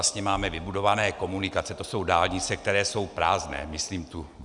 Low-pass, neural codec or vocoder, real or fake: 10.8 kHz; none; real